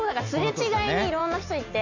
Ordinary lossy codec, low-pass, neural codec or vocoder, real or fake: none; 7.2 kHz; none; real